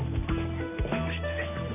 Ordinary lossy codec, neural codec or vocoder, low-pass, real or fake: none; none; 3.6 kHz; real